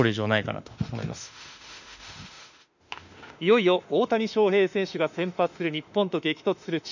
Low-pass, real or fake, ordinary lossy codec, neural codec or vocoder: 7.2 kHz; fake; none; autoencoder, 48 kHz, 32 numbers a frame, DAC-VAE, trained on Japanese speech